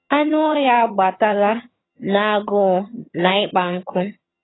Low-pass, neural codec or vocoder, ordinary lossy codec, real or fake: 7.2 kHz; vocoder, 22.05 kHz, 80 mel bands, HiFi-GAN; AAC, 16 kbps; fake